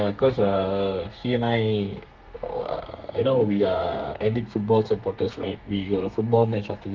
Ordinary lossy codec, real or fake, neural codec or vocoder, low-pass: Opus, 24 kbps; fake; codec, 32 kHz, 1.9 kbps, SNAC; 7.2 kHz